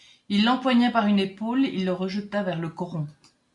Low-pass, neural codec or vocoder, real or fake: 10.8 kHz; none; real